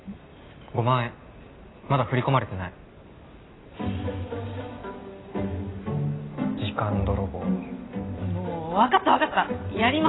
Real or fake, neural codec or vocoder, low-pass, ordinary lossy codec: real; none; 7.2 kHz; AAC, 16 kbps